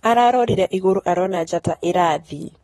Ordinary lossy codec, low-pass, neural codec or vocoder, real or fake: AAC, 32 kbps; 19.8 kHz; vocoder, 44.1 kHz, 128 mel bands, Pupu-Vocoder; fake